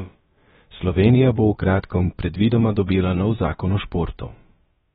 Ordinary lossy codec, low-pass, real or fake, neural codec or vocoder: AAC, 16 kbps; 7.2 kHz; fake; codec, 16 kHz, about 1 kbps, DyCAST, with the encoder's durations